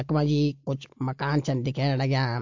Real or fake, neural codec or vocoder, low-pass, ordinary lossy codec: real; none; 7.2 kHz; MP3, 48 kbps